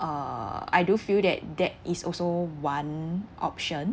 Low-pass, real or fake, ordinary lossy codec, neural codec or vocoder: none; real; none; none